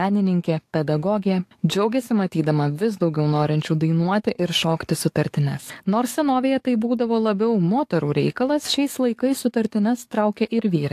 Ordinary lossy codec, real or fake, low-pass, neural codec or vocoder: AAC, 64 kbps; fake; 14.4 kHz; codec, 44.1 kHz, 7.8 kbps, DAC